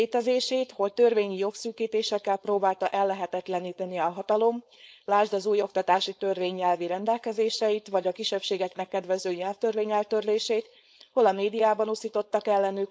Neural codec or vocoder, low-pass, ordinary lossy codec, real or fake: codec, 16 kHz, 4.8 kbps, FACodec; none; none; fake